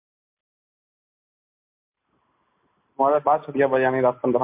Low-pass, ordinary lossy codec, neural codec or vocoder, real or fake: 3.6 kHz; MP3, 32 kbps; none; real